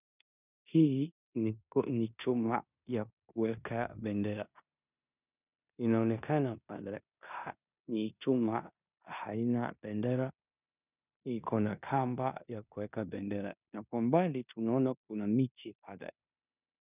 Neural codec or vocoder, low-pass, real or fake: codec, 16 kHz in and 24 kHz out, 0.9 kbps, LongCat-Audio-Codec, four codebook decoder; 3.6 kHz; fake